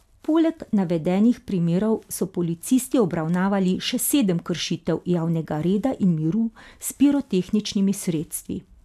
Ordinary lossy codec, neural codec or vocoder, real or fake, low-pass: none; none; real; 14.4 kHz